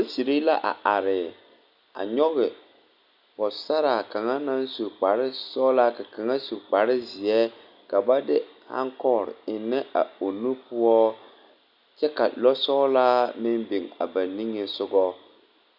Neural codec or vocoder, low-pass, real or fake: none; 5.4 kHz; real